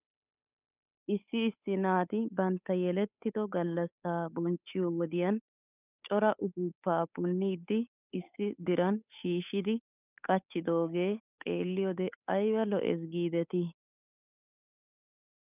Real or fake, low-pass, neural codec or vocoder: fake; 3.6 kHz; codec, 16 kHz, 8 kbps, FunCodec, trained on Chinese and English, 25 frames a second